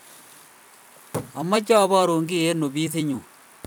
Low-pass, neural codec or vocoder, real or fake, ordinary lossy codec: none; vocoder, 44.1 kHz, 128 mel bands every 256 samples, BigVGAN v2; fake; none